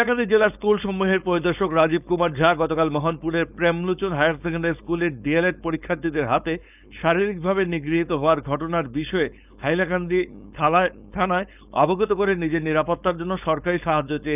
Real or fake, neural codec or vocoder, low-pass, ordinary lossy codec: fake; codec, 16 kHz, 4.8 kbps, FACodec; 3.6 kHz; none